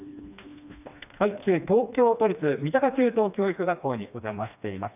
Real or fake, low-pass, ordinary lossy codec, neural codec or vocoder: fake; 3.6 kHz; none; codec, 16 kHz, 2 kbps, FreqCodec, smaller model